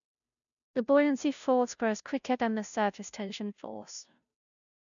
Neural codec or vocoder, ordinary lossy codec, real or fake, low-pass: codec, 16 kHz, 0.5 kbps, FunCodec, trained on Chinese and English, 25 frames a second; none; fake; 7.2 kHz